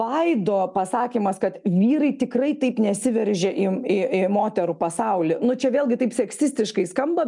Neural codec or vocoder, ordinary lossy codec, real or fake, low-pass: none; MP3, 96 kbps; real; 10.8 kHz